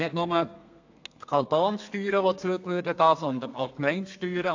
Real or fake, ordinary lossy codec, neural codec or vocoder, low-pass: fake; AAC, 48 kbps; codec, 32 kHz, 1.9 kbps, SNAC; 7.2 kHz